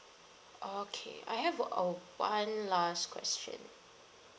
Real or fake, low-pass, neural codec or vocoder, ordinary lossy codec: real; none; none; none